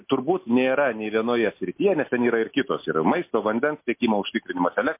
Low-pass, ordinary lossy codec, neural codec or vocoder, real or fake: 3.6 kHz; MP3, 24 kbps; none; real